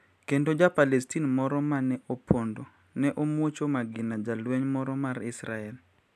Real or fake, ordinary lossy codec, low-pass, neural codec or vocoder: real; none; 14.4 kHz; none